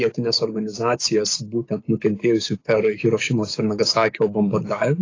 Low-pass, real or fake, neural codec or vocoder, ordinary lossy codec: 7.2 kHz; fake; codec, 16 kHz, 6 kbps, DAC; AAC, 32 kbps